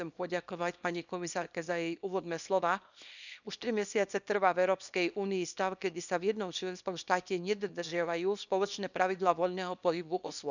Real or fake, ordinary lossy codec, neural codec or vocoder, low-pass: fake; none; codec, 24 kHz, 0.9 kbps, WavTokenizer, small release; 7.2 kHz